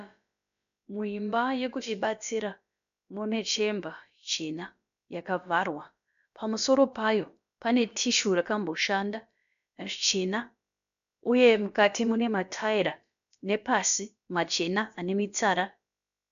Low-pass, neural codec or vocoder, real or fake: 7.2 kHz; codec, 16 kHz, about 1 kbps, DyCAST, with the encoder's durations; fake